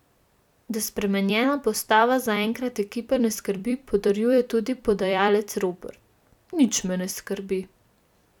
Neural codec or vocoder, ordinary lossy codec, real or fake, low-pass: vocoder, 44.1 kHz, 128 mel bands every 256 samples, BigVGAN v2; none; fake; 19.8 kHz